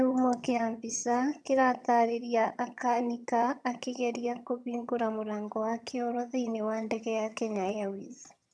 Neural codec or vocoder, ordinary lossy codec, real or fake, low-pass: vocoder, 22.05 kHz, 80 mel bands, HiFi-GAN; none; fake; none